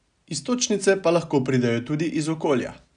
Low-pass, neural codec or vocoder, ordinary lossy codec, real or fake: 9.9 kHz; none; none; real